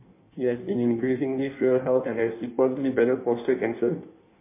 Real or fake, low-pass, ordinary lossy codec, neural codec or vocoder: fake; 3.6 kHz; MP3, 24 kbps; codec, 16 kHz in and 24 kHz out, 1.1 kbps, FireRedTTS-2 codec